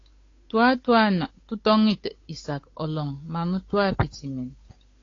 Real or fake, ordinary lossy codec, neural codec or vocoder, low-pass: fake; AAC, 32 kbps; codec, 16 kHz, 8 kbps, FunCodec, trained on Chinese and English, 25 frames a second; 7.2 kHz